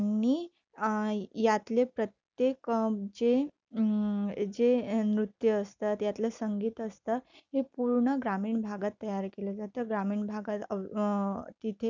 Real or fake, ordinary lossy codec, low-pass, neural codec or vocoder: real; none; 7.2 kHz; none